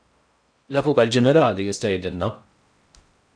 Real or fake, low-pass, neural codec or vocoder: fake; 9.9 kHz; codec, 16 kHz in and 24 kHz out, 0.6 kbps, FocalCodec, streaming, 4096 codes